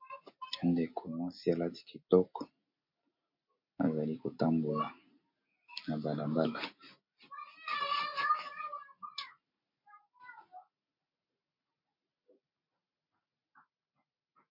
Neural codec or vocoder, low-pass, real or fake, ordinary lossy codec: none; 5.4 kHz; real; MP3, 32 kbps